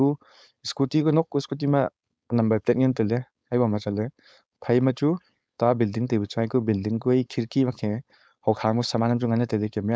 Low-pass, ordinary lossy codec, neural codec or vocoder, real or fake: none; none; codec, 16 kHz, 4.8 kbps, FACodec; fake